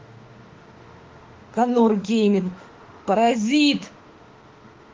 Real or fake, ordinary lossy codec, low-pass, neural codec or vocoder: fake; Opus, 16 kbps; 7.2 kHz; autoencoder, 48 kHz, 32 numbers a frame, DAC-VAE, trained on Japanese speech